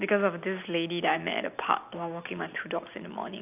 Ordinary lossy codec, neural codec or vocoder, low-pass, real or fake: AAC, 32 kbps; none; 3.6 kHz; real